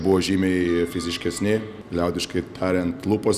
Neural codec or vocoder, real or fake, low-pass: none; real; 14.4 kHz